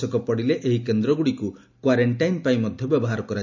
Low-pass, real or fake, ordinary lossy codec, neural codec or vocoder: 7.2 kHz; real; none; none